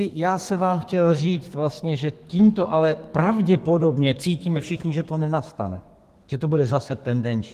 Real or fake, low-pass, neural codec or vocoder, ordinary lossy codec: fake; 14.4 kHz; codec, 32 kHz, 1.9 kbps, SNAC; Opus, 24 kbps